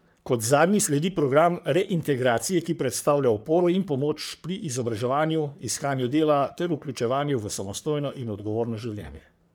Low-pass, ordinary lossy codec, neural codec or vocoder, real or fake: none; none; codec, 44.1 kHz, 3.4 kbps, Pupu-Codec; fake